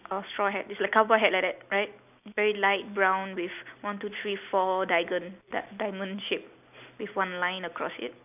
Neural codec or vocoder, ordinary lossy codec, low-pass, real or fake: none; none; 3.6 kHz; real